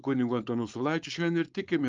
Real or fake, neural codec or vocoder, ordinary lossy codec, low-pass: fake; codec, 16 kHz, 4.8 kbps, FACodec; Opus, 24 kbps; 7.2 kHz